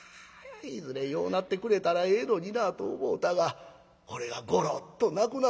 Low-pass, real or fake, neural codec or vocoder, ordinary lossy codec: none; real; none; none